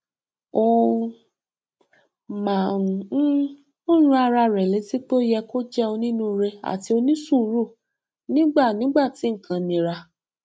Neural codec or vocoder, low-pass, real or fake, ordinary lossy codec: none; none; real; none